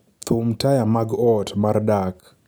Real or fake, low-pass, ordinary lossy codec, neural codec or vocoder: real; none; none; none